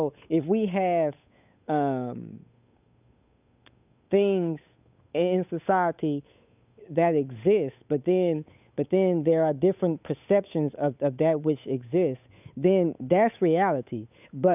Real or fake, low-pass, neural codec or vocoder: fake; 3.6 kHz; codec, 16 kHz, 8 kbps, FunCodec, trained on Chinese and English, 25 frames a second